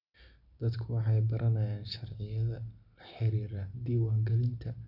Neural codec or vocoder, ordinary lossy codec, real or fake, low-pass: none; none; real; 5.4 kHz